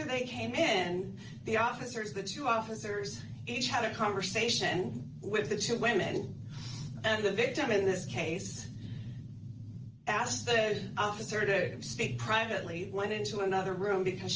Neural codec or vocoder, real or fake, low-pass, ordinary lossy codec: none; real; 7.2 kHz; Opus, 24 kbps